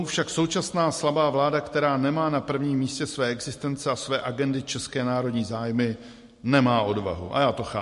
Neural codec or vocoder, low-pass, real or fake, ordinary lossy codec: none; 14.4 kHz; real; MP3, 48 kbps